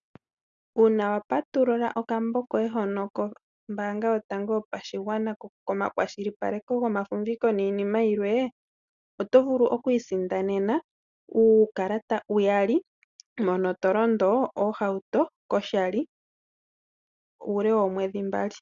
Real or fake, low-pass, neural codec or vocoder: real; 7.2 kHz; none